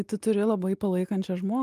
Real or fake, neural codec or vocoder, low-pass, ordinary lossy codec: real; none; 14.4 kHz; Opus, 32 kbps